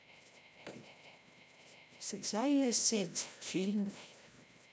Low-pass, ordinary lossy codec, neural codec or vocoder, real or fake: none; none; codec, 16 kHz, 0.5 kbps, FreqCodec, larger model; fake